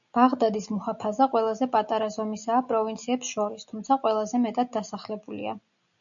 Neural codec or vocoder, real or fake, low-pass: none; real; 7.2 kHz